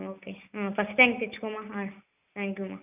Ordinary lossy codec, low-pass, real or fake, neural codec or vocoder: none; 3.6 kHz; real; none